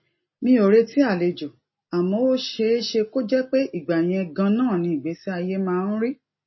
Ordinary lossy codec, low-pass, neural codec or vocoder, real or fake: MP3, 24 kbps; 7.2 kHz; none; real